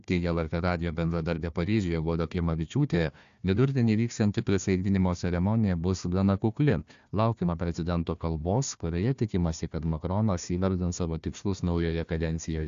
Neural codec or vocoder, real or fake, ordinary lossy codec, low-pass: codec, 16 kHz, 1 kbps, FunCodec, trained on Chinese and English, 50 frames a second; fake; AAC, 64 kbps; 7.2 kHz